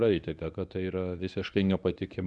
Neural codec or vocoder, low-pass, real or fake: codec, 24 kHz, 0.9 kbps, WavTokenizer, medium speech release version 1; 10.8 kHz; fake